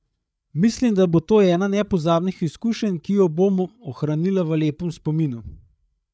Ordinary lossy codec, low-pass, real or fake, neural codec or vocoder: none; none; fake; codec, 16 kHz, 8 kbps, FreqCodec, larger model